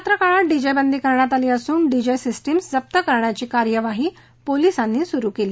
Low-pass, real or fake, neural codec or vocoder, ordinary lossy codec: none; real; none; none